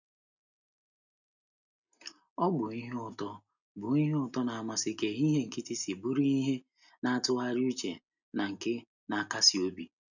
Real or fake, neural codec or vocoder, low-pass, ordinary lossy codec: real; none; 7.2 kHz; none